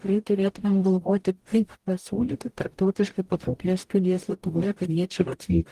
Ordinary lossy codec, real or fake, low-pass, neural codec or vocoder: Opus, 24 kbps; fake; 14.4 kHz; codec, 44.1 kHz, 0.9 kbps, DAC